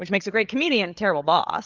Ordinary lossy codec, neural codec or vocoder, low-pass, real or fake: Opus, 32 kbps; codec, 16 kHz, 8 kbps, FreqCodec, larger model; 7.2 kHz; fake